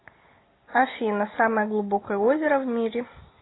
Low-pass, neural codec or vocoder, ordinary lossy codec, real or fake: 7.2 kHz; none; AAC, 16 kbps; real